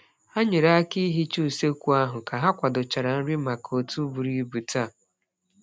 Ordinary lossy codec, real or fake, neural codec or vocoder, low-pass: none; real; none; none